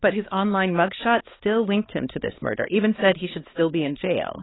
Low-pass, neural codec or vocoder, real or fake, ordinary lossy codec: 7.2 kHz; codec, 16 kHz, 4 kbps, X-Codec, HuBERT features, trained on balanced general audio; fake; AAC, 16 kbps